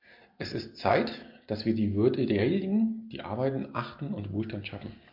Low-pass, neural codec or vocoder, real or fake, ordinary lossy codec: 5.4 kHz; none; real; MP3, 48 kbps